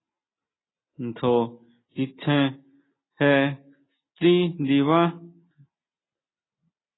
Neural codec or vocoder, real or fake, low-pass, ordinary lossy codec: none; real; 7.2 kHz; AAC, 16 kbps